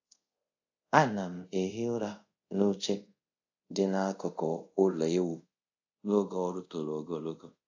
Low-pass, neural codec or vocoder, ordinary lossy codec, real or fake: 7.2 kHz; codec, 24 kHz, 0.5 kbps, DualCodec; AAC, 48 kbps; fake